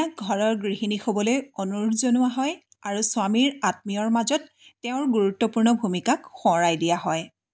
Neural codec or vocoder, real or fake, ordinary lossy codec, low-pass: none; real; none; none